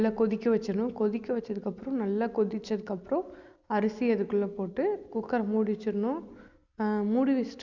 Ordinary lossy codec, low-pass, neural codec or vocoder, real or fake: Opus, 64 kbps; 7.2 kHz; none; real